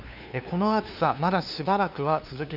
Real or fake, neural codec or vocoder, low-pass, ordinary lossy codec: fake; codec, 16 kHz, 2 kbps, FunCodec, trained on LibriTTS, 25 frames a second; 5.4 kHz; none